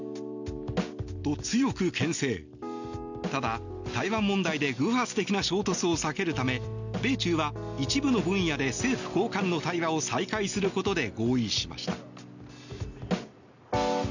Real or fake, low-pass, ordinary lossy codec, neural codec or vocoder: real; 7.2 kHz; none; none